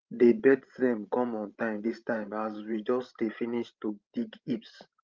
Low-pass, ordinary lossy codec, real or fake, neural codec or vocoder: 7.2 kHz; Opus, 32 kbps; fake; codec, 16 kHz, 16 kbps, FreqCodec, larger model